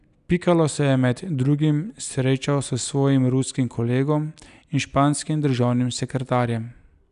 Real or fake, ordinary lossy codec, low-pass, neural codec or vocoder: real; none; 10.8 kHz; none